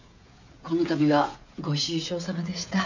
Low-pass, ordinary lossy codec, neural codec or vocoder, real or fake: 7.2 kHz; none; codec, 16 kHz, 16 kbps, FreqCodec, smaller model; fake